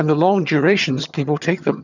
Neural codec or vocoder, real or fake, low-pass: vocoder, 22.05 kHz, 80 mel bands, HiFi-GAN; fake; 7.2 kHz